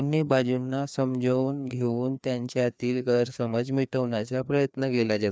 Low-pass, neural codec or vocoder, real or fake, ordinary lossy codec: none; codec, 16 kHz, 2 kbps, FreqCodec, larger model; fake; none